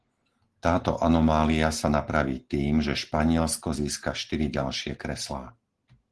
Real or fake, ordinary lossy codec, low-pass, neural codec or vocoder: real; Opus, 16 kbps; 10.8 kHz; none